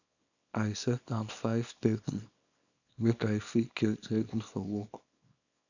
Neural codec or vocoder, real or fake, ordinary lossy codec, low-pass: codec, 24 kHz, 0.9 kbps, WavTokenizer, small release; fake; none; 7.2 kHz